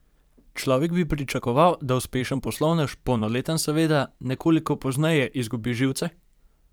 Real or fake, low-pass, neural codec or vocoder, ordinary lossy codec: fake; none; vocoder, 44.1 kHz, 128 mel bands every 512 samples, BigVGAN v2; none